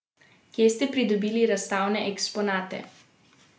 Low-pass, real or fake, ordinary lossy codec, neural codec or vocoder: none; real; none; none